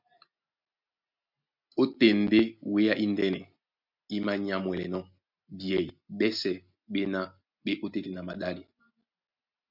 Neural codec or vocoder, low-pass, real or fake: none; 5.4 kHz; real